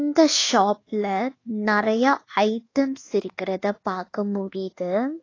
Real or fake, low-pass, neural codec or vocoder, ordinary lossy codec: fake; 7.2 kHz; codec, 24 kHz, 1.2 kbps, DualCodec; AAC, 32 kbps